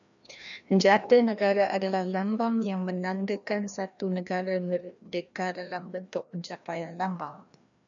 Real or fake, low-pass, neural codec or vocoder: fake; 7.2 kHz; codec, 16 kHz, 1 kbps, FreqCodec, larger model